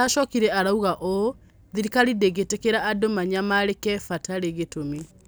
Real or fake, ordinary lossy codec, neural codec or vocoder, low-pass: real; none; none; none